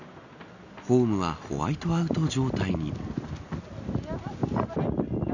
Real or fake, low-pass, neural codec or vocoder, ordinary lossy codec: real; 7.2 kHz; none; none